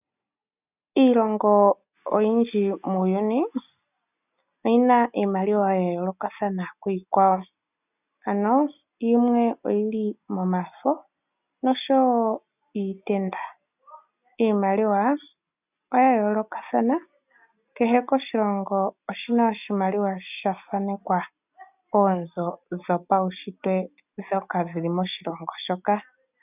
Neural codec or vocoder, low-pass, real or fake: none; 3.6 kHz; real